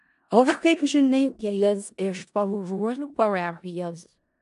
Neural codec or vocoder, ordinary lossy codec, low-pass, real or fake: codec, 16 kHz in and 24 kHz out, 0.4 kbps, LongCat-Audio-Codec, four codebook decoder; AAC, 64 kbps; 10.8 kHz; fake